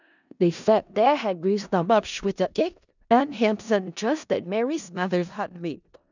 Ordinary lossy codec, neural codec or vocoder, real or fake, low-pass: none; codec, 16 kHz in and 24 kHz out, 0.4 kbps, LongCat-Audio-Codec, four codebook decoder; fake; 7.2 kHz